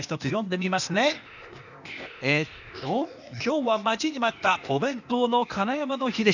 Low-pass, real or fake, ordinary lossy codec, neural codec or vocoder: 7.2 kHz; fake; none; codec, 16 kHz, 0.8 kbps, ZipCodec